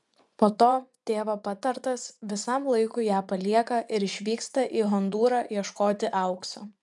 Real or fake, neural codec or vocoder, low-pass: fake; vocoder, 24 kHz, 100 mel bands, Vocos; 10.8 kHz